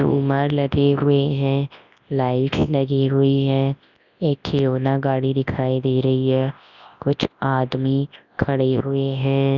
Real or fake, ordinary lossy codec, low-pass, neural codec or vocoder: fake; none; 7.2 kHz; codec, 24 kHz, 0.9 kbps, WavTokenizer, large speech release